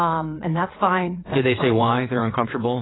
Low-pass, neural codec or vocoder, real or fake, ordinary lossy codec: 7.2 kHz; vocoder, 22.05 kHz, 80 mel bands, WaveNeXt; fake; AAC, 16 kbps